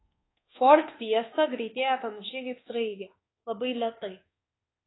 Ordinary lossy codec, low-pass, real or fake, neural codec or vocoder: AAC, 16 kbps; 7.2 kHz; fake; codec, 24 kHz, 1.2 kbps, DualCodec